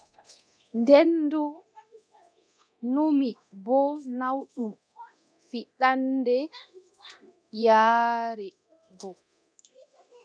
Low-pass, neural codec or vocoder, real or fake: 9.9 kHz; codec, 24 kHz, 0.9 kbps, DualCodec; fake